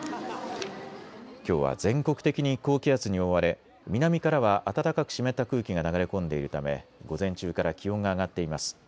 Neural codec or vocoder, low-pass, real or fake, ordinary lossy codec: none; none; real; none